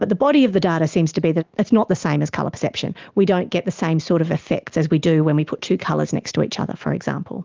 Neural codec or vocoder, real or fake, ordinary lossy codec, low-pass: none; real; Opus, 32 kbps; 7.2 kHz